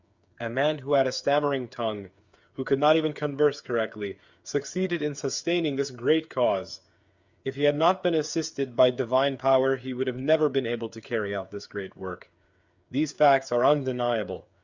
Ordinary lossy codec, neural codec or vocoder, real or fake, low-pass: Opus, 64 kbps; codec, 16 kHz, 8 kbps, FreqCodec, smaller model; fake; 7.2 kHz